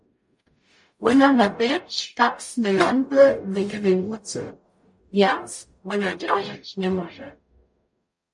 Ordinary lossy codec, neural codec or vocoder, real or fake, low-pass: MP3, 48 kbps; codec, 44.1 kHz, 0.9 kbps, DAC; fake; 10.8 kHz